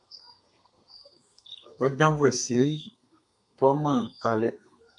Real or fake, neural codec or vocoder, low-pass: fake; codec, 32 kHz, 1.9 kbps, SNAC; 10.8 kHz